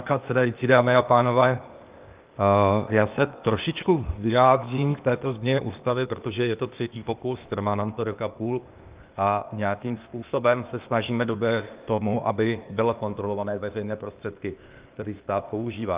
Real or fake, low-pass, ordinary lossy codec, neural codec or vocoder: fake; 3.6 kHz; Opus, 32 kbps; codec, 16 kHz, 0.8 kbps, ZipCodec